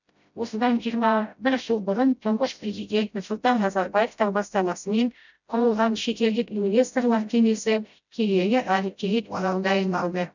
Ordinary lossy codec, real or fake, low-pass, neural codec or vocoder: none; fake; 7.2 kHz; codec, 16 kHz, 0.5 kbps, FreqCodec, smaller model